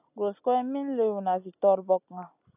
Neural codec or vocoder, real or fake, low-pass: none; real; 3.6 kHz